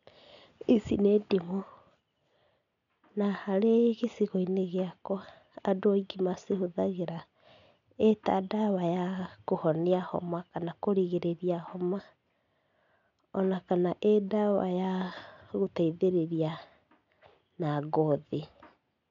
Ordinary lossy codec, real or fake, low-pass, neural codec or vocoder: none; real; 7.2 kHz; none